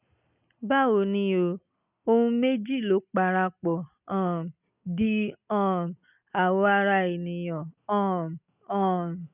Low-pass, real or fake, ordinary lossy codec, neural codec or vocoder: 3.6 kHz; real; none; none